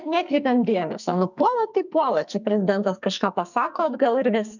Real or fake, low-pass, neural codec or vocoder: fake; 7.2 kHz; codec, 44.1 kHz, 2.6 kbps, SNAC